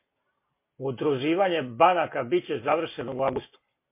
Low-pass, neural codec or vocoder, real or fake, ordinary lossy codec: 3.6 kHz; none; real; MP3, 24 kbps